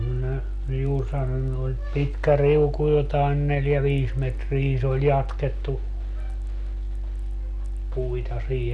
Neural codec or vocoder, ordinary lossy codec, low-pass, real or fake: none; none; none; real